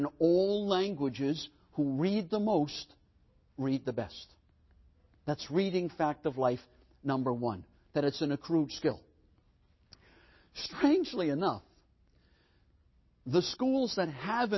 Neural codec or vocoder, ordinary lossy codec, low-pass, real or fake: none; MP3, 24 kbps; 7.2 kHz; real